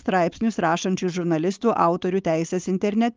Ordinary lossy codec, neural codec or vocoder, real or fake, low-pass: Opus, 24 kbps; codec, 16 kHz, 4.8 kbps, FACodec; fake; 7.2 kHz